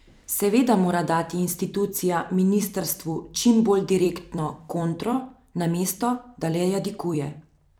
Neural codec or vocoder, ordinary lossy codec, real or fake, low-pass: vocoder, 44.1 kHz, 128 mel bands every 256 samples, BigVGAN v2; none; fake; none